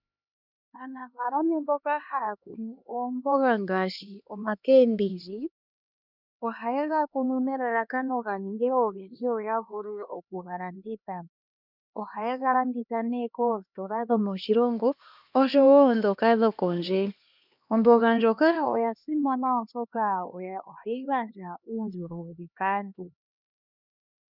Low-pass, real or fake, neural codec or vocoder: 5.4 kHz; fake; codec, 16 kHz, 2 kbps, X-Codec, HuBERT features, trained on LibriSpeech